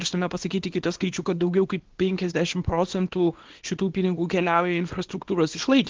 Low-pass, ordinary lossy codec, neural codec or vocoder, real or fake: 7.2 kHz; Opus, 16 kbps; codec, 24 kHz, 0.9 kbps, WavTokenizer, small release; fake